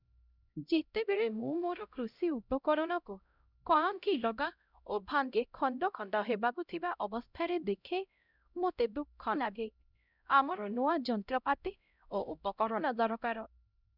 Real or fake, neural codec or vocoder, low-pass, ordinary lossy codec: fake; codec, 16 kHz, 0.5 kbps, X-Codec, HuBERT features, trained on LibriSpeech; 5.4 kHz; none